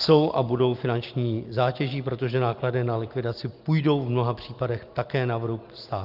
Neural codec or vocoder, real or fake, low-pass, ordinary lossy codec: none; real; 5.4 kHz; Opus, 24 kbps